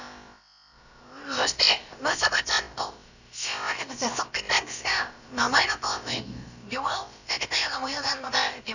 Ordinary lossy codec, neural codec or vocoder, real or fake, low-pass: none; codec, 16 kHz, about 1 kbps, DyCAST, with the encoder's durations; fake; 7.2 kHz